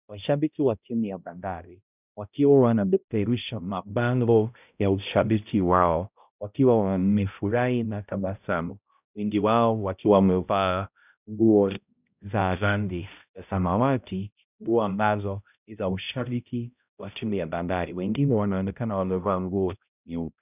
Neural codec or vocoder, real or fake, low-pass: codec, 16 kHz, 0.5 kbps, X-Codec, HuBERT features, trained on balanced general audio; fake; 3.6 kHz